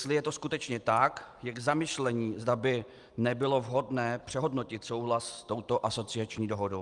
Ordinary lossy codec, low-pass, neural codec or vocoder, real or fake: Opus, 32 kbps; 10.8 kHz; none; real